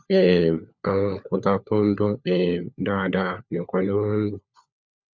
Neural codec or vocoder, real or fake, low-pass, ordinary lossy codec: codec, 16 kHz, 4 kbps, FunCodec, trained on LibriTTS, 50 frames a second; fake; 7.2 kHz; none